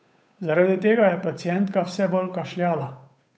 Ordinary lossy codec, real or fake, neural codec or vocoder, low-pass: none; fake; codec, 16 kHz, 8 kbps, FunCodec, trained on Chinese and English, 25 frames a second; none